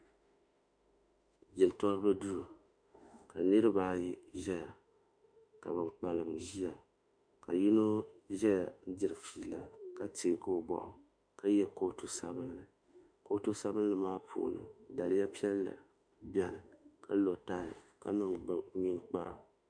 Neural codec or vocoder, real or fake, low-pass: autoencoder, 48 kHz, 32 numbers a frame, DAC-VAE, trained on Japanese speech; fake; 9.9 kHz